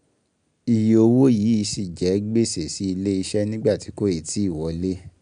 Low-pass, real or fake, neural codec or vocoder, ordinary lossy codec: 9.9 kHz; real; none; none